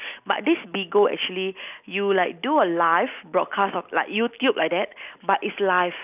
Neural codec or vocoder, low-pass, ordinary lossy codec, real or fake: none; 3.6 kHz; none; real